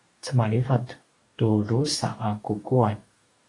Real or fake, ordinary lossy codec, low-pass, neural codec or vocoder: fake; AAC, 48 kbps; 10.8 kHz; codec, 44.1 kHz, 2.6 kbps, DAC